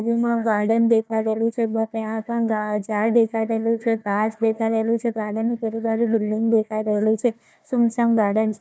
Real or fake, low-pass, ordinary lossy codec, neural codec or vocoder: fake; none; none; codec, 16 kHz, 1 kbps, FunCodec, trained on Chinese and English, 50 frames a second